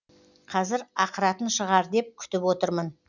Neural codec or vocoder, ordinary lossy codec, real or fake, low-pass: none; none; real; 7.2 kHz